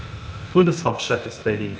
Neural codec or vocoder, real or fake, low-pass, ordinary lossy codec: codec, 16 kHz, 0.8 kbps, ZipCodec; fake; none; none